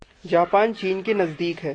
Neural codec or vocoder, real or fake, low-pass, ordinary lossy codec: none; real; 9.9 kHz; AAC, 32 kbps